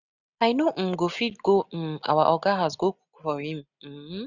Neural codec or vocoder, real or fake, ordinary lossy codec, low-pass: none; real; none; 7.2 kHz